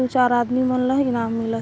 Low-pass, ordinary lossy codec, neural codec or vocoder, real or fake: none; none; none; real